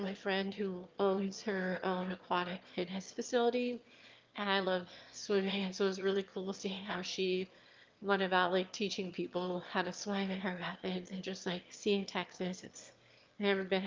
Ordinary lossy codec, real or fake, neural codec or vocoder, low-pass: Opus, 32 kbps; fake; autoencoder, 22.05 kHz, a latent of 192 numbers a frame, VITS, trained on one speaker; 7.2 kHz